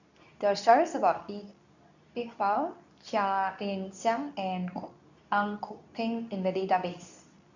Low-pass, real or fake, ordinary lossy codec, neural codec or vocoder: 7.2 kHz; fake; none; codec, 24 kHz, 0.9 kbps, WavTokenizer, medium speech release version 2